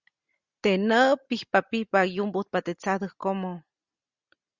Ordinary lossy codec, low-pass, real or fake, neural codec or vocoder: Opus, 64 kbps; 7.2 kHz; real; none